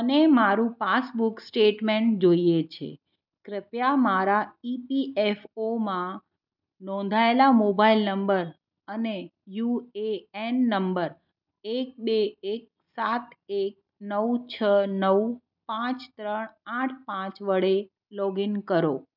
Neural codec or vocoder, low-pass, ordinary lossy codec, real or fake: none; 5.4 kHz; none; real